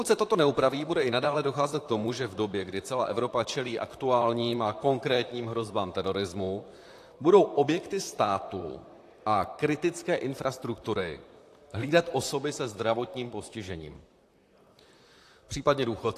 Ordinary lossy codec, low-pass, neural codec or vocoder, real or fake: AAC, 64 kbps; 14.4 kHz; vocoder, 44.1 kHz, 128 mel bands, Pupu-Vocoder; fake